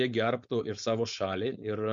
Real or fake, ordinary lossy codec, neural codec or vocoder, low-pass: fake; MP3, 48 kbps; codec, 16 kHz, 4.8 kbps, FACodec; 7.2 kHz